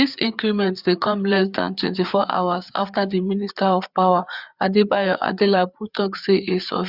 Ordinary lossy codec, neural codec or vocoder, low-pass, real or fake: Opus, 64 kbps; codec, 16 kHz, 4 kbps, FreqCodec, larger model; 5.4 kHz; fake